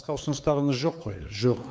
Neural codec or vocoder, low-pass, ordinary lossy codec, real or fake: codec, 16 kHz, 4 kbps, X-Codec, WavLM features, trained on Multilingual LibriSpeech; none; none; fake